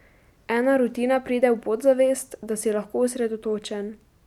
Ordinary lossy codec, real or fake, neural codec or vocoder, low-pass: none; real; none; 19.8 kHz